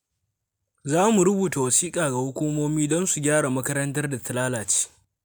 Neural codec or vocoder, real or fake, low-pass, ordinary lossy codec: none; real; none; none